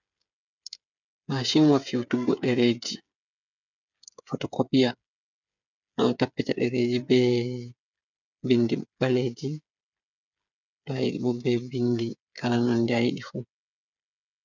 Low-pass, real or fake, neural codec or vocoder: 7.2 kHz; fake; codec, 16 kHz, 8 kbps, FreqCodec, smaller model